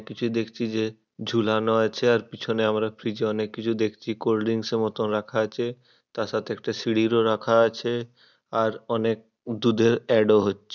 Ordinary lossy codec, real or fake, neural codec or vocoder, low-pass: none; real; none; 7.2 kHz